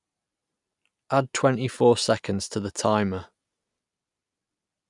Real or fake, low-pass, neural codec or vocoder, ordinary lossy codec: real; 10.8 kHz; none; none